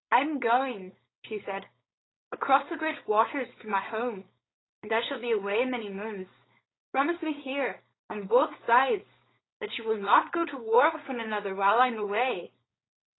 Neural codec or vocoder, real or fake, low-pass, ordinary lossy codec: codec, 16 kHz, 4.8 kbps, FACodec; fake; 7.2 kHz; AAC, 16 kbps